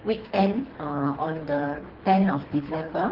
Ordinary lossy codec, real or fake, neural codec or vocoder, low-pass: Opus, 16 kbps; fake; codec, 24 kHz, 3 kbps, HILCodec; 5.4 kHz